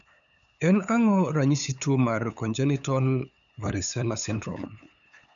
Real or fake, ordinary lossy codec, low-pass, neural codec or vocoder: fake; none; 7.2 kHz; codec, 16 kHz, 8 kbps, FunCodec, trained on LibriTTS, 25 frames a second